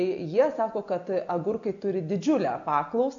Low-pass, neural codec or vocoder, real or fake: 7.2 kHz; none; real